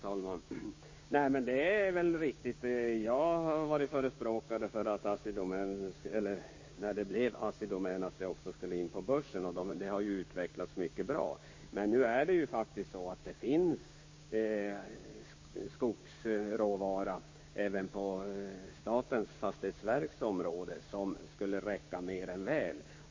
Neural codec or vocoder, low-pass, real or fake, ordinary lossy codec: codec, 16 kHz, 6 kbps, DAC; 7.2 kHz; fake; MP3, 32 kbps